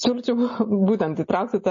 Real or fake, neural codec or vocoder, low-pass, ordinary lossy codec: real; none; 7.2 kHz; MP3, 32 kbps